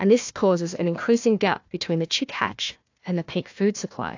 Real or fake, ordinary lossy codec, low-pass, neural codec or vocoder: fake; AAC, 48 kbps; 7.2 kHz; codec, 16 kHz, 1 kbps, FunCodec, trained on Chinese and English, 50 frames a second